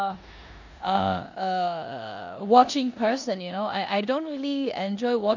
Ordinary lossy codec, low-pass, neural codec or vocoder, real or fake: none; 7.2 kHz; codec, 16 kHz in and 24 kHz out, 0.9 kbps, LongCat-Audio-Codec, four codebook decoder; fake